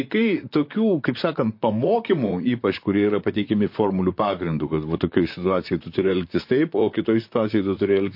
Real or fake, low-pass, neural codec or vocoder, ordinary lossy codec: fake; 5.4 kHz; vocoder, 44.1 kHz, 128 mel bands, Pupu-Vocoder; MP3, 32 kbps